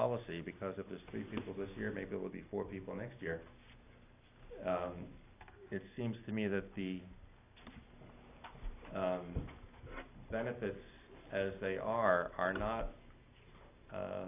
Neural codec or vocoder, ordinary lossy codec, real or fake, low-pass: none; AAC, 24 kbps; real; 3.6 kHz